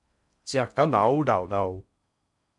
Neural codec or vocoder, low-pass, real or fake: codec, 16 kHz in and 24 kHz out, 0.6 kbps, FocalCodec, streaming, 4096 codes; 10.8 kHz; fake